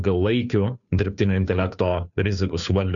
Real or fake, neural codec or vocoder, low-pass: fake; codec, 16 kHz, 2 kbps, FunCodec, trained on Chinese and English, 25 frames a second; 7.2 kHz